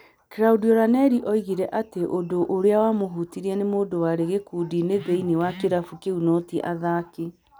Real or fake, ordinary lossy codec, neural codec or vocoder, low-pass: real; none; none; none